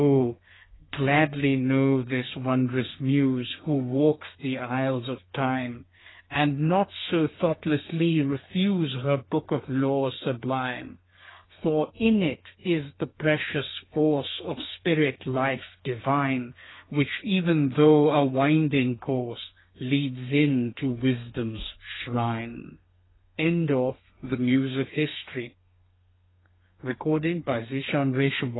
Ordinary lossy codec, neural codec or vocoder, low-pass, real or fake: AAC, 16 kbps; codec, 32 kHz, 1.9 kbps, SNAC; 7.2 kHz; fake